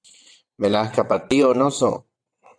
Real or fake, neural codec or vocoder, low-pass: fake; vocoder, 22.05 kHz, 80 mel bands, WaveNeXt; 9.9 kHz